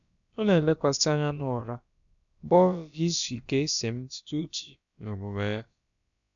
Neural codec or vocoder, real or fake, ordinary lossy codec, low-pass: codec, 16 kHz, about 1 kbps, DyCAST, with the encoder's durations; fake; none; 7.2 kHz